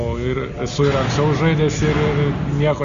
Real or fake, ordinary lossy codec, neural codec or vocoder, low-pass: real; MP3, 64 kbps; none; 7.2 kHz